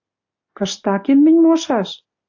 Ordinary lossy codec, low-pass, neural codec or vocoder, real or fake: AAC, 48 kbps; 7.2 kHz; none; real